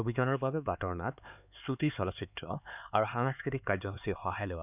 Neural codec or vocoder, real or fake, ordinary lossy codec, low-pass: codec, 16 kHz, 4 kbps, X-Codec, HuBERT features, trained on LibriSpeech; fake; none; 3.6 kHz